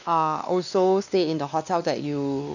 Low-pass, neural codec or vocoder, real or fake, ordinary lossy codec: 7.2 kHz; codec, 16 kHz, 2 kbps, X-Codec, WavLM features, trained on Multilingual LibriSpeech; fake; none